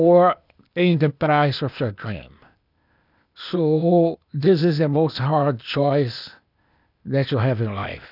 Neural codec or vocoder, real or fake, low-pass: codec, 16 kHz, 0.8 kbps, ZipCodec; fake; 5.4 kHz